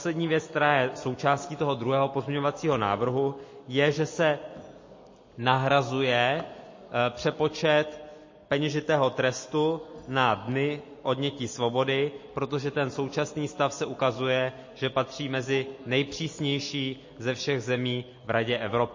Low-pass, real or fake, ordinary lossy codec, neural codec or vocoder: 7.2 kHz; real; MP3, 32 kbps; none